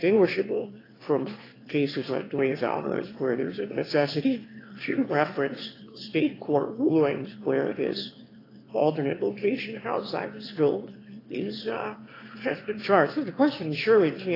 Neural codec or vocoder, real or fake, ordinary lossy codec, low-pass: autoencoder, 22.05 kHz, a latent of 192 numbers a frame, VITS, trained on one speaker; fake; AAC, 24 kbps; 5.4 kHz